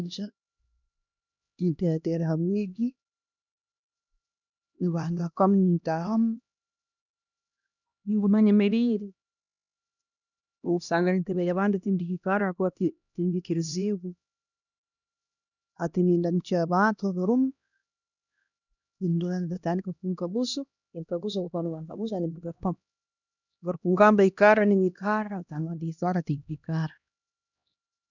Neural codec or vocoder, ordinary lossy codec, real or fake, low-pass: codec, 16 kHz, 1 kbps, X-Codec, HuBERT features, trained on LibriSpeech; none; fake; 7.2 kHz